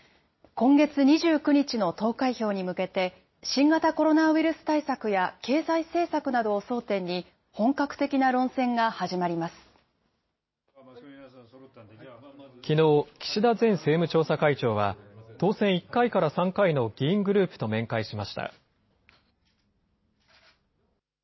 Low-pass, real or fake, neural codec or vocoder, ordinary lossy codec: 7.2 kHz; real; none; MP3, 24 kbps